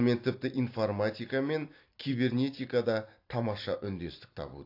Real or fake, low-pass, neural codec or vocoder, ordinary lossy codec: real; 5.4 kHz; none; AAC, 32 kbps